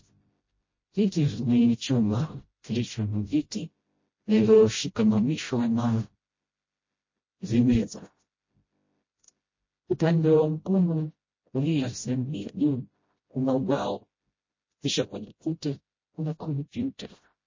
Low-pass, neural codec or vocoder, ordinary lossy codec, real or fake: 7.2 kHz; codec, 16 kHz, 0.5 kbps, FreqCodec, smaller model; MP3, 32 kbps; fake